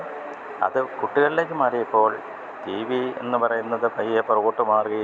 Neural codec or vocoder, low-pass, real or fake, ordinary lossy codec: none; none; real; none